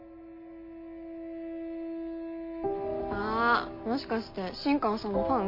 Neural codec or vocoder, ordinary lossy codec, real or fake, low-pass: none; MP3, 32 kbps; real; 5.4 kHz